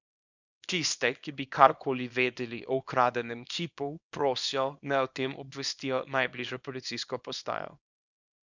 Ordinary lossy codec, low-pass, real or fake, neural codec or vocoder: none; 7.2 kHz; fake; codec, 24 kHz, 0.9 kbps, WavTokenizer, small release